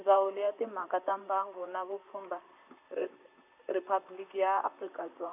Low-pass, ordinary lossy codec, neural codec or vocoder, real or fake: 3.6 kHz; none; vocoder, 44.1 kHz, 128 mel bands, Pupu-Vocoder; fake